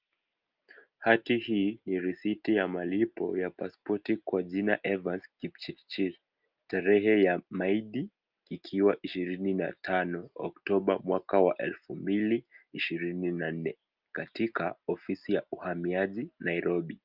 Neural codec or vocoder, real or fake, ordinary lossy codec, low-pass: none; real; Opus, 24 kbps; 5.4 kHz